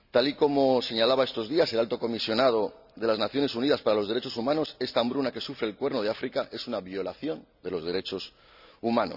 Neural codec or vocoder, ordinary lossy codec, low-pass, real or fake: none; none; 5.4 kHz; real